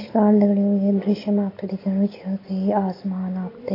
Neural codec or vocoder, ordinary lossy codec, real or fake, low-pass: none; AAC, 24 kbps; real; 5.4 kHz